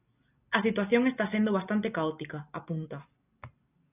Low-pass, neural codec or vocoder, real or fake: 3.6 kHz; none; real